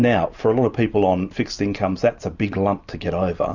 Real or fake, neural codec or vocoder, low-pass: real; none; 7.2 kHz